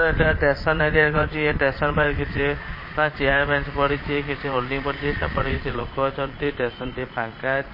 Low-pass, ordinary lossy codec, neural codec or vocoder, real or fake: 5.4 kHz; MP3, 24 kbps; vocoder, 22.05 kHz, 80 mel bands, Vocos; fake